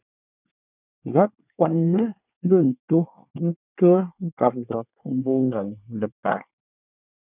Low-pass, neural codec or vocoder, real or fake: 3.6 kHz; codec, 24 kHz, 1 kbps, SNAC; fake